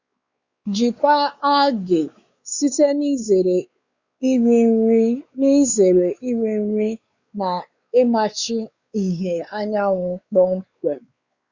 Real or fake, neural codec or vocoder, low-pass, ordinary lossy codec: fake; codec, 16 kHz, 4 kbps, X-Codec, WavLM features, trained on Multilingual LibriSpeech; 7.2 kHz; Opus, 64 kbps